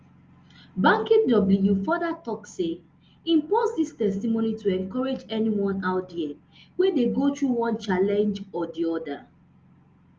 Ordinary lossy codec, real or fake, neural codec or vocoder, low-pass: Opus, 32 kbps; real; none; 7.2 kHz